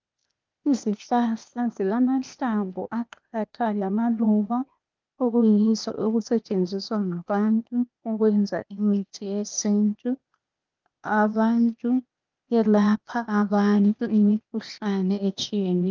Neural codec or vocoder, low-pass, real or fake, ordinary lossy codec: codec, 16 kHz, 0.8 kbps, ZipCodec; 7.2 kHz; fake; Opus, 24 kbps